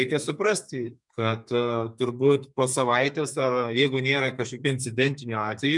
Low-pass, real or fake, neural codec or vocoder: 10.8 kHz; fake; codec, 32 kHz, 1.9 kbps, SNAC